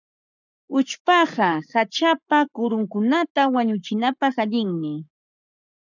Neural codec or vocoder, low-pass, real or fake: codec, 44.1 kHz, 7.8 kbps, Pupu-Codec; 7.2 kHz; fake